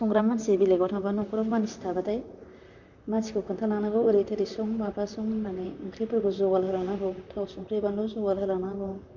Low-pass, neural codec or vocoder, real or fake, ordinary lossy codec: 7.2 kHz; vocoder, 44.1 kHz, 128 mel bands, Pupu-Vocoder; fake; none